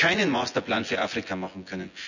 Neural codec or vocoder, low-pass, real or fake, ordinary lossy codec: vocoder, 24 kHz, 100 mel bands, Vocos; 7.2 kHz; fake; none